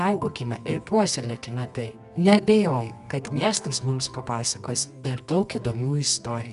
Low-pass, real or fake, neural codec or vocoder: 10.8 kHz; fake; codec, 24 kHz, 0.9 kbps, WavTokenizer, medium music audio release